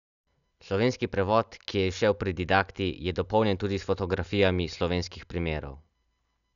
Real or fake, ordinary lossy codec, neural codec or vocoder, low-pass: real; none; none; 7.2 kHz